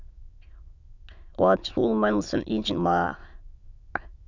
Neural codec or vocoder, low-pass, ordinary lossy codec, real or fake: autoencoder, 22.05 kHz, a latent of 192 numbers a frame, VITS, trained on many speakers; 7.2 kHz; Opus, 64 kbps; fake